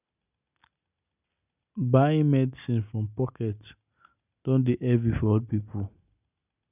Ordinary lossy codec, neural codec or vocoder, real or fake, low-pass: none; none; real; 3.6 kHz